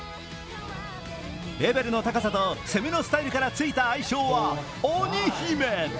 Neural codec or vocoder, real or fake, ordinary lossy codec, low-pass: none; real; none; none